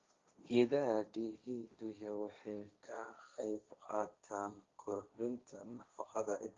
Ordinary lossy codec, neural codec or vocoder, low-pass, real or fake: Opus, 32 kbps; codec, 16 kHz, 1.1 kbps, Voila-Tokenizer; 7.2 kHz; fake